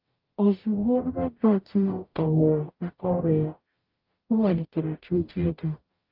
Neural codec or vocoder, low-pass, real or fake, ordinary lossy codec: codec, 44.1 kHz, 0.9 kbps, DAC; 5.4 kHz; fake; Opus, 24 kbps